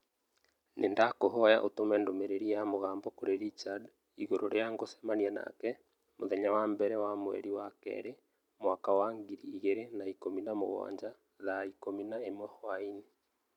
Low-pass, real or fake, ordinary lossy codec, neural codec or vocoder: 19.8 kHz; real; none; none